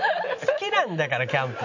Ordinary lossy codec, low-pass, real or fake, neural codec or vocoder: none; 7.2 kHz; real; none